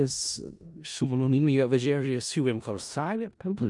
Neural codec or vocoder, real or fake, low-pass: codec, 16 kHz in and 24 kHz out, 0.4 kbps, LongCat-Audio-Codec, four codebook decoder; fake; 10.8 kHz